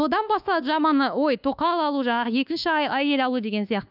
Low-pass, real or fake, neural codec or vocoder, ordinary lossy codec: 5.4 kHz; fake; codec, 24 kHz, 1.2 kbps, DualCodec; none